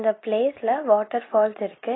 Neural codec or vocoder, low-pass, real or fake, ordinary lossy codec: none; 7.2 kHz; real; AAC, 16 kbps